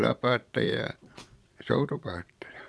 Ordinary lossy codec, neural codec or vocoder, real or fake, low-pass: none; vocoder, 22.05 kHz, 80 mel bands, Vocos; fake; none